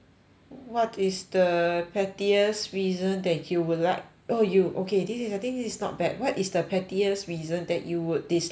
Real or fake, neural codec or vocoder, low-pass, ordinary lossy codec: real; none; none; none